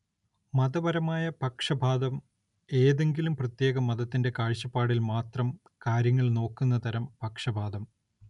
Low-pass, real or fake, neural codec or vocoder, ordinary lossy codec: 10.8 kHz; real; none; none